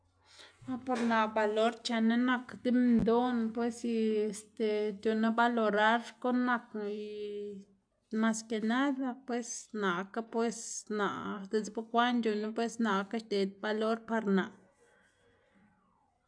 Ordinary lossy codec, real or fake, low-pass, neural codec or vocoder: MP3, 96 kbps; real; 9.9 kHz; none